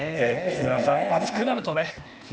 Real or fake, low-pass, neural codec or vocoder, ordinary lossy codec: fake; none; codec, 16 kHz, 0.8 kbps, ZipCodec; none